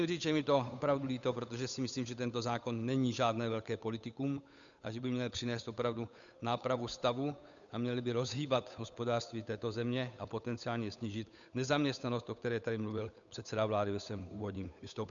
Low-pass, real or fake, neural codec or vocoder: 7.2 kHz; fake; codec, 16 kHz, 8 kbps, FunCodec, trained on Chinese and English, 25 frames a second